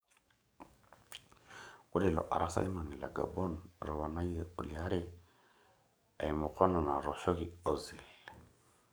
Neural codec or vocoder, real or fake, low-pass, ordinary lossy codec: codec, 44.1 kHz, 7.8 kbps, DAC; fake; none; none